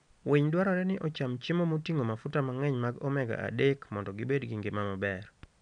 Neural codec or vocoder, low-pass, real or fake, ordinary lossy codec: none; 9.9 kHz; real; none